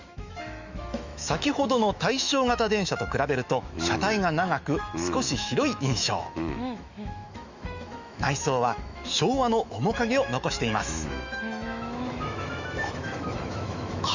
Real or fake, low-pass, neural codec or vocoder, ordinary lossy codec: fake; 7.2 kHz; autoencoder, 48 kHz, 128 numbers a frame, DAC-VAE, trained on Japanese speech; Opus, 64 kbps